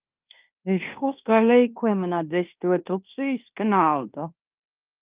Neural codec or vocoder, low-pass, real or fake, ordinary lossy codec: codec, 16 kHz in and 24 kHz out, 0.9 kbps, LongCat-Audio-Codec, fine tuned four codebook decoder; 3.6 kHz; fake; Opus, 24 kbps